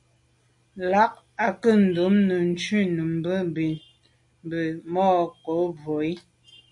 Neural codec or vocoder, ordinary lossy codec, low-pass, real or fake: none; MP3, 48 kbps; 10.8 kHz; real